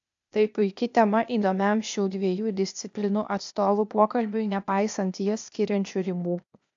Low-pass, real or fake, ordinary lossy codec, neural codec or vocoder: 7.2 kHz; fake; AAC, 64 kbps; codec, 16 kHz, 0.8 kbps, ZipCodec